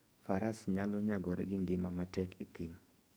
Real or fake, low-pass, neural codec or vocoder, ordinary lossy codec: fake; none; codec, 44.1 kHz, 2.6 kbps, SNAC; none